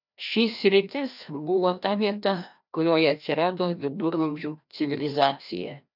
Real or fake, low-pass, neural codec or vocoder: fake; 5.4 kHz; codec, 16 kHz, 1 kbps, FreqCodec, larger model